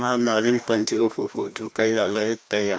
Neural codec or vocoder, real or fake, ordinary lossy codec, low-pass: codec, 16 kHz, 1 kbps, FreqCodec, larger model; fake; none; none